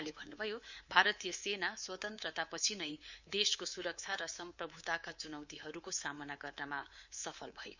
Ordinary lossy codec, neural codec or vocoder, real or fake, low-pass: Opus, 64 kbps; codec, 24 kHz, 3.1 kbps, DualCodec; fake; 7.2 kHz